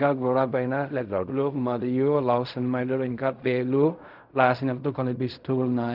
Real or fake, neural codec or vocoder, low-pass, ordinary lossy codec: fake; codec, 16 kHz in and 24 kHz out, 0.4 kbps, LongCat-Audio-Codec, fine tuned four codebook decoder; 5.4 kHz; none